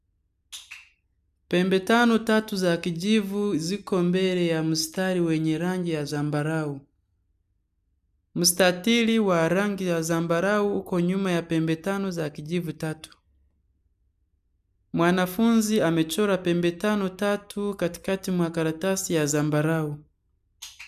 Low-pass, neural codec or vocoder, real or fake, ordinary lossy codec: 14.4 kHz; none; real; none